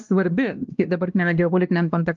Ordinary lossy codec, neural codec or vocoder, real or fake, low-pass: Opus, 32 kbps; codec, 16 kHz, 1 kbps, X-Codec, WavLM features, trained on Multilingual LibriSpeech; fake; 7.2 kHz